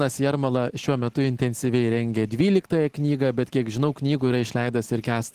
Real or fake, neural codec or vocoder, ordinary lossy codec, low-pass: real; none; Opus, 16 kbps; 14.4 kHz